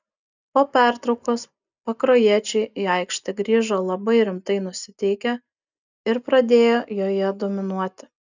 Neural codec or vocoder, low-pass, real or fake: none; 7.2 kHz; real